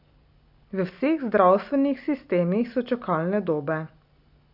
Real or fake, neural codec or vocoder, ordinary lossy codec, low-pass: real; none; none; 5.4 kHz